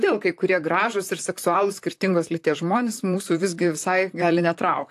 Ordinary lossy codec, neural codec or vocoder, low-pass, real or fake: AAC, 64 kbps; vocoder, 44.1 kHz, 128 mel bands, Pupu-Vocoder; 14.4 kHz; fake